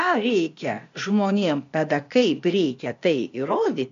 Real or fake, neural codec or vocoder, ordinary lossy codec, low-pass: fake; codec, 16 kHz, 0.8 kbps, ZipCodec; MP3, 48 kbps; 7.2 kHz